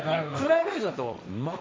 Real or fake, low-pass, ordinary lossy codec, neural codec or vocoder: fake; none; none; codec, 16 kHz, 1.1 kbps, Voila-Tokenizer